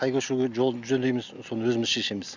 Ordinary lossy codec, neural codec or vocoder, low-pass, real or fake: Opus, 64 kbps; none; 7.2 kHz; real